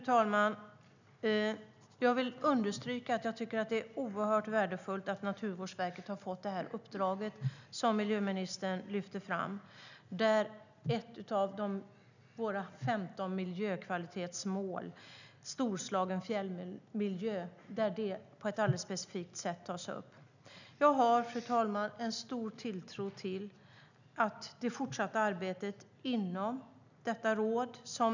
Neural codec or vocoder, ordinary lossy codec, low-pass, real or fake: none; none; 7.2 kHz; real